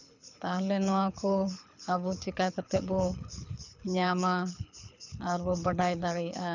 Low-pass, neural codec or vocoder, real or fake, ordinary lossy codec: 7.2 kHz; codec, 24 kHz, 6 kbps, HILCodec; fake; none